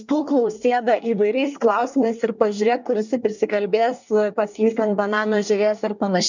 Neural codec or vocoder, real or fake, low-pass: codec, 24 kHz, 1 kbps, SNAC; fake; 7.2 kHz